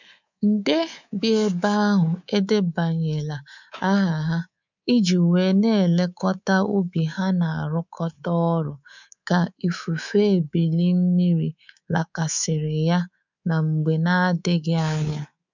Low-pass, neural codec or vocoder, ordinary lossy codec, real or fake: 7.2 kHz; codec, 24 kHz, 3.1 kbps, DualCodec; none; fake